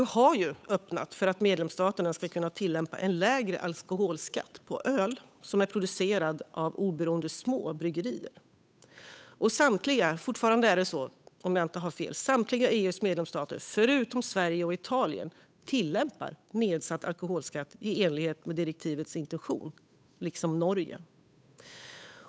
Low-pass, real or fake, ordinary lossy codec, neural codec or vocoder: none; fake; none; codec, 16 kHz, 8 kbps, FunCodec, trained on Chinese and English, 25 frames a second